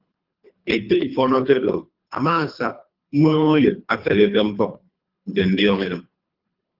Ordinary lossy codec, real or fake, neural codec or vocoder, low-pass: Opus, 24 kbps; fake; codec, 24 kHz, 3 kbps, HILCodec; 5.4 kHz